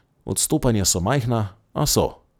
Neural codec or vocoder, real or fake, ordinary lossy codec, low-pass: none; real; none; none